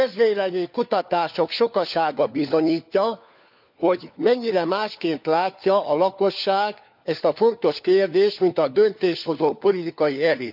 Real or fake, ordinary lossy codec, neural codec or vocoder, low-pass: fake; none; codec, 16 kHz, 4 kbps, FunCodec, trained on LibriTTS, 50 frames a second; 5.4 kHz